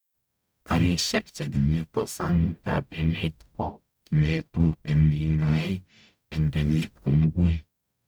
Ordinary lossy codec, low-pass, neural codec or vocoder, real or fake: none; none; codec, 44.1 kHz, 0.9 kbps, DAC; fake